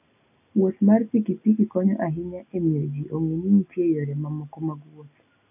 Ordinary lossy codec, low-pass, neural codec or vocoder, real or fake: none; 3.6 kHz; none; real